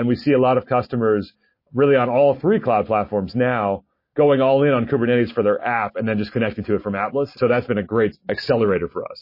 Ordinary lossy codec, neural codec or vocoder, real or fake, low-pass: MP3, 24 kbps; none; real; 5.4 kHz